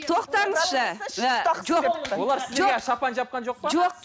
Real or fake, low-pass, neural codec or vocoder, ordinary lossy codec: real; none; none; none